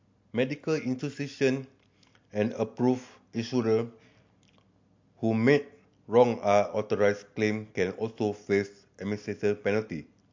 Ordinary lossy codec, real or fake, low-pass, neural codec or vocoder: MP3, 48 kbps; real; 7.2 kHz; none